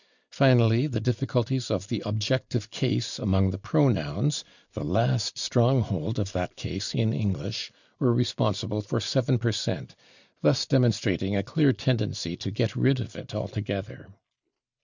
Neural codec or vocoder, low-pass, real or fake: none; 7.2 kHz; real